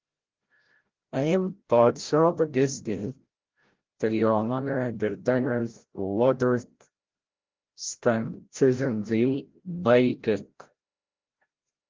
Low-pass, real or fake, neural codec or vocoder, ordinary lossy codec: 7.2 kHz; fake; codec, 16 kHz, 0.5 kbps, FreqCodec, larger model; Opus, 16 kbps